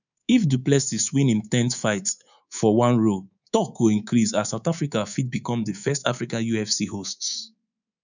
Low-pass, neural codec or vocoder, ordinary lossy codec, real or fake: 7.2 kHz; codec, 24 kHz, 3.1 kbps, DualCodec; none; fake